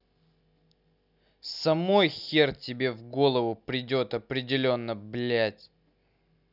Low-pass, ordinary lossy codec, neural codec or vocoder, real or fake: 5.4 kHz; none; none; real